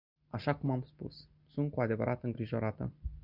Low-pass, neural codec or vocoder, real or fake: 5.4 kHz; none; real